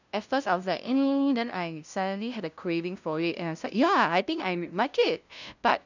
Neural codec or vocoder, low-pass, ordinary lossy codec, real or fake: codec, 16 kHz, 0.5 kbps, FunCodec, trained on LibriTTS, 25 frames a second; 7.2 kHz; none; fake